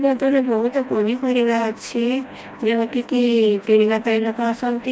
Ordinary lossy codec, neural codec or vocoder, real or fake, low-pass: none; codec, 16 kHz, 1 kbps, FreqCodec, smaller model; fake; none